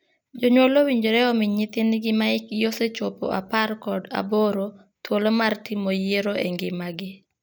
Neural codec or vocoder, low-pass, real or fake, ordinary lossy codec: none; none; real; none